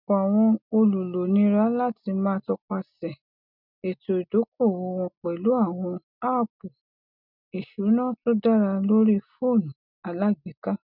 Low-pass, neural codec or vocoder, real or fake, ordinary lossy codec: 5.4 kHz; none; real; none